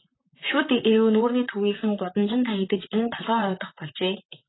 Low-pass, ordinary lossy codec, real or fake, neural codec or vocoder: 7.2 kHz; AAC, 16 kbps; fake; vocoder, 44.1 kHz, 128 mel bands, Pupu-Vocoder